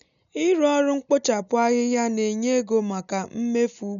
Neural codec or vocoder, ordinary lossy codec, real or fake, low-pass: none; none; real; 7.2 kHz